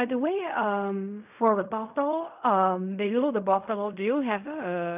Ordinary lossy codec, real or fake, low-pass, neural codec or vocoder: none; fake; 3.6 kHz; codec, 16 kHz in and 24 kHz out, 0.4 kbps, LongCat-Audio-Codec, fine tuned four codebook decoder